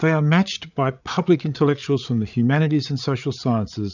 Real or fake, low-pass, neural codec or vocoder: fake; 7.2 kHz; codec, 16 kHz, 8 kbps, FreqCodec, larger model